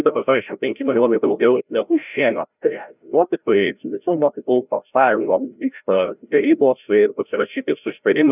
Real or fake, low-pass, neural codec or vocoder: fake; 3.6 kHz; codec, 16 kHz, 0.5 kbps, FreqCodec, larger model